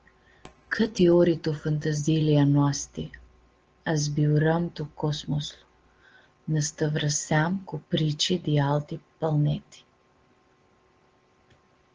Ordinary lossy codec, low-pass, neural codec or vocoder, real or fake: Opus, 16 kbps; 7.2 kHz; none; real